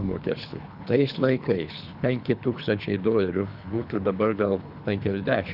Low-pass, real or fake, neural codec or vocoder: 5.4 kHz; fake; codec, 24 kHz, 3 kbps, HILCodec